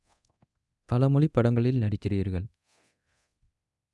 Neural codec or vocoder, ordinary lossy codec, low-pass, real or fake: codec, 24 kHz, 0.9 kbps, DualCodec; none; none; fake